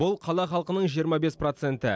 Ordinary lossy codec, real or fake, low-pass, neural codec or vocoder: none; real; none; none